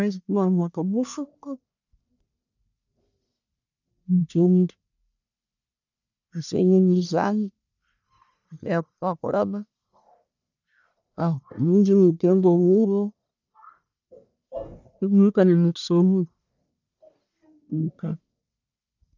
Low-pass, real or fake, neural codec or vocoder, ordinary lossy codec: 7.2 kHz; fake; codec, 44.1 kHz, 1.7 kbps, Pupu-Codec; none